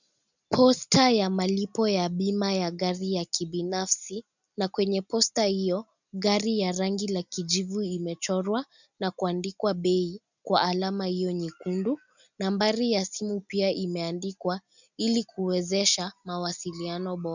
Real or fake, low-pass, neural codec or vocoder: real; 7.2 kHz; none